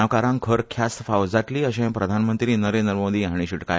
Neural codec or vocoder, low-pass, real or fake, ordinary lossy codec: none; none; real; none